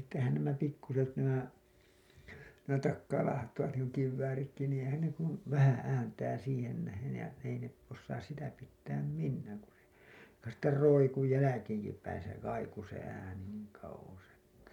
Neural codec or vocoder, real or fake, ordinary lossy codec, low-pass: none; real; MP3, 96 kbps; 19.8 kHz